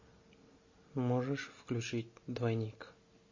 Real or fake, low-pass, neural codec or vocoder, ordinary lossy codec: real; 7.2 kHz; none; MP3, 32 kbps